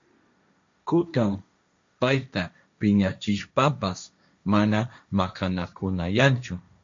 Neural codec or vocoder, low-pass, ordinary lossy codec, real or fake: codec, 16 kHz, 1.1 kbps, Voila-Tokenizer; 7.2 kHz; MP3, 48 kbps; fake